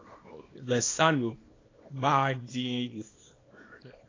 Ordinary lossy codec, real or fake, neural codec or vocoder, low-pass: AAC, 32 kbps; fake; codec, 24 kHz, 0.9 kbps, WavTokenizer, small release; 7.2 kHz